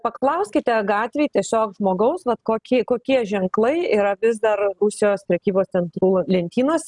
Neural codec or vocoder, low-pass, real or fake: none; 10.8 kHz; real